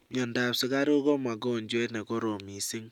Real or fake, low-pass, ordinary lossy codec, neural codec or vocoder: real; 19.8 kHz; none; none